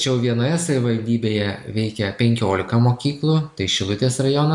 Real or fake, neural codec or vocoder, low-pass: real; none; 10.8 kHz